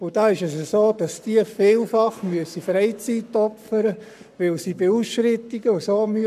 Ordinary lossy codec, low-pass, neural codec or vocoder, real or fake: none; 14.4 kHz; codec, 44.1 kHz, 7.8 kbps, Pupu-Codec; fake